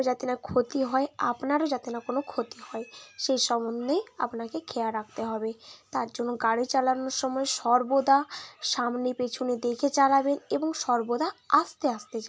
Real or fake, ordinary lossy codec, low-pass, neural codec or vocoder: real; none; none; none